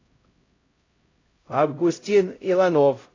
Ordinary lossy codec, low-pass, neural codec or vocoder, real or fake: AAC, 32 kbps; 7.2 kHz; codec, 16 kHz, 0.5 kbps, X-Codec, HuBERT features, trained on LibriSpeech; fake